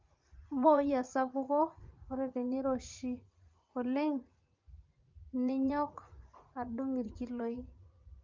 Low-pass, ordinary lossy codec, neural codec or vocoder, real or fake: 7.2 kHz; Opus, 24 kbps; vocoder, 44.1 kHz, 80 mel bands, Vocos; fake